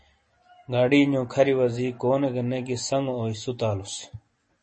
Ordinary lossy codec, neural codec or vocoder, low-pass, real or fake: MP3, 32 kbps; none; 10.8 kHz; real